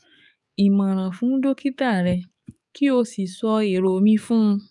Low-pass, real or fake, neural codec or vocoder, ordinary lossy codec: 10.8 kHz; fake; codec, 44.1 kHz, 7.8 kbps, DAC; none